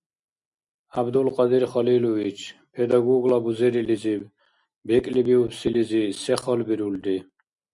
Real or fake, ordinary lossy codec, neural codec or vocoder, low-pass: real; MP3, 64 kbps; none; 10.8 kHz